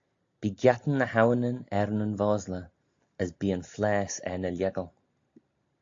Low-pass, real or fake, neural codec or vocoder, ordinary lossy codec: 7.2 kHz; real; none; AAC, 48 kbps